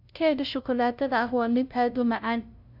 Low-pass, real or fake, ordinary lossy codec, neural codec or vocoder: 5.4 kHz; fake; none; codec, 16 kHz, 0.5 kbps, FunCodec, trained on LibriTTS, 25 frames a second